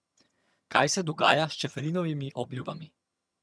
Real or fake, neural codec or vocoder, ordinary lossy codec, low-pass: fake; vocoder, 22.05 kHz, 80 mel bands, HiFi-GAN; none; none